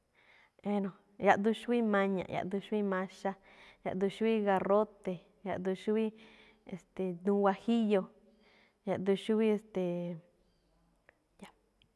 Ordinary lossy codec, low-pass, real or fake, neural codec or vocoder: none; none; real; none